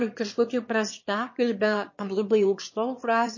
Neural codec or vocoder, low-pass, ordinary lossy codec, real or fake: autoencoder, 22.05 kHz, a latent of 192 numbers a frame, VITS, trained on one speaker; 7.2 kHz; MP3, 32 kbps; fake